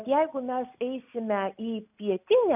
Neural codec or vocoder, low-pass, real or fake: none; 3.6 kHz; real